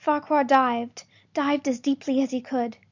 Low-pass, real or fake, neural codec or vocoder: 7.2 kHz; real; none